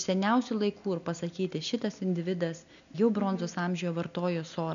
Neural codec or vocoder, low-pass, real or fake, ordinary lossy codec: none; 7.2 kHz; real; MP3, 96 kbps